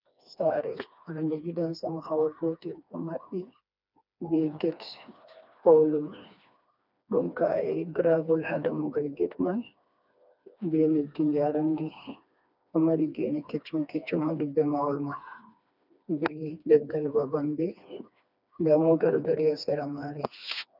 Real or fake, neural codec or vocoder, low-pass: fake; codec, 16 kHz, 2 kbps, FreqCodec, smaller model; 5.4 kHz